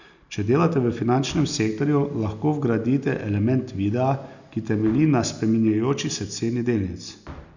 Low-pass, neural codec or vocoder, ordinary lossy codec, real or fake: 7.2 kHz; none; none; real